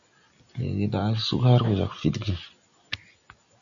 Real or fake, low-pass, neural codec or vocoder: real; 7.2 kHz; none